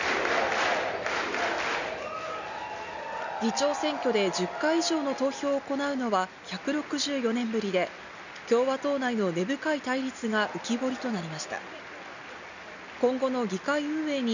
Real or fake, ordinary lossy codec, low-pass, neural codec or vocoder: real; none; 7.2 kHz; none